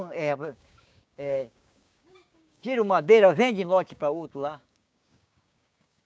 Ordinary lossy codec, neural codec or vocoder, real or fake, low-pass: none; codec, 16 kHz, 6 kbps, DAC; fake; none